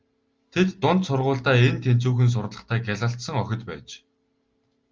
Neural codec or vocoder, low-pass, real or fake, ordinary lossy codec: none; 7.2 kHz; real; Opus, 32 kbps